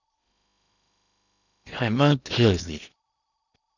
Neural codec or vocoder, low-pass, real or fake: codec, 16 kHz in and 24 kHz out, 0.8 kbps, FocalCodec, streaming, 65536 codes; 7.2 kHz; fake